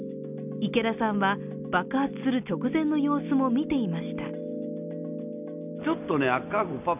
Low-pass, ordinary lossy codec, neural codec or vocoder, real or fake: 3.6 kHz; none; none; real